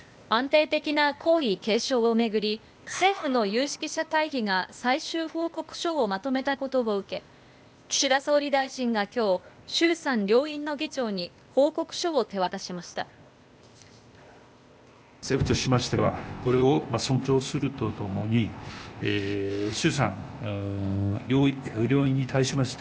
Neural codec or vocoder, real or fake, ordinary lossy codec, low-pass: codec, 16 kHz, 0.8 kbps, ZipCodec; fake; none; none